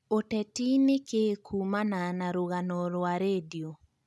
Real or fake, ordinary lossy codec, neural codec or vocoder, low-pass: real; none; none; none